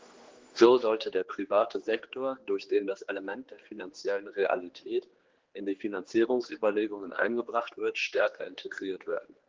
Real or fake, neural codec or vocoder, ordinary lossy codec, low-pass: fake; codec, 16 kHz, 2 kbps, X-Codec, HuBERT features, trained on general audio; Opus, 16 kbps; 7.2 kHz